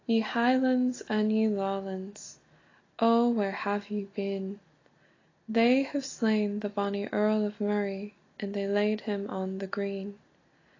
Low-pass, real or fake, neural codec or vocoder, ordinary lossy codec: 7.2 kHz; real; none; AAC, 32 kbps